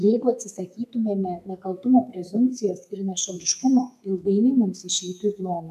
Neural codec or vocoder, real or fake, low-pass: codec, 32 kHz, 1.9 kbps, SNAC; fake; 14.4 kHz